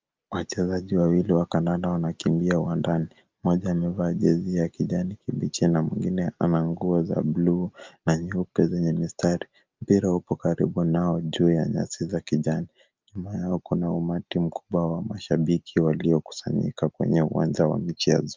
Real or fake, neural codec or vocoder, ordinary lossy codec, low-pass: real; none; Opus, 24 kbps; 7.2 kHz